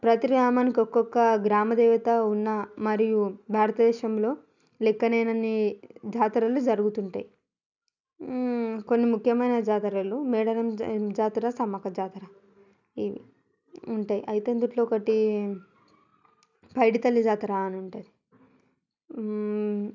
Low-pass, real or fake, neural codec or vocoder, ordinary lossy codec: 7.2 kHz; real; none; none